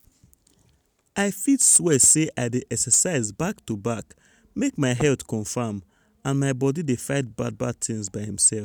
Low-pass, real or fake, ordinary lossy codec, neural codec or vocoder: none; real; none; none